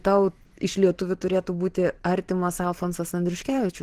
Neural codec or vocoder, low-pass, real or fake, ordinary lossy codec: vocoder, 44.1 kHz, 128 mel bands every 512 samples, BigVGAN v2; 14.4 kHz; fake; Opus, 16 kbps